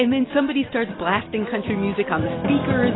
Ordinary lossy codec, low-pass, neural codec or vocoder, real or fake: AAC, 16 kbps; 7.2 kHz; none; real